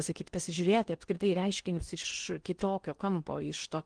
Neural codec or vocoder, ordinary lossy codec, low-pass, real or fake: codec, 16 kHz in and 24 kHz out, 0.8 kbps, FocalCodec, streaming, 65536 codes; Opus, 24 kbps; 9.9 kHz; fake